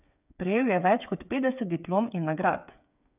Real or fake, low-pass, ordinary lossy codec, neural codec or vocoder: fake; 3.6 kHz; none; codec, 16 kHz, 8 kbps, FreqCodec, smaller model